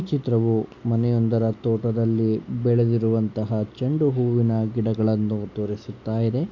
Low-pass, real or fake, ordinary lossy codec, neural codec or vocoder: 7.2 kHz; real; MP3, 64 kbps; none